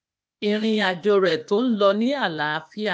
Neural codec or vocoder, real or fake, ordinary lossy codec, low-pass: codec, 16 kHz, 0.8 kbps, ZipCodec; fake; none; none